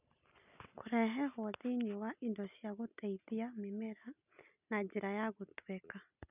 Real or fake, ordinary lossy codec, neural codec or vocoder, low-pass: real; none; none; 3.6 kHz